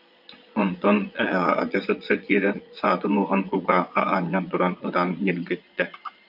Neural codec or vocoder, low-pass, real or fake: vocoder, 22.05 kHz, 80 mel bands, Vocos; 5.4 kHz; fake